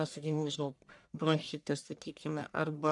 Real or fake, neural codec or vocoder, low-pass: fake; codec, 44.1 kHz, 1.7 kbps, Pupu-Codec; 10.8 kHz